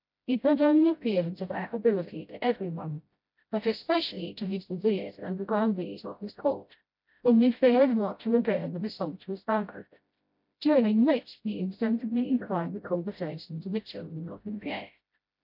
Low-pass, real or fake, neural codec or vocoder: 5.4 kHz; fake; codec, 16 kHz, 0.5 kbps, FreqCodec, smaller model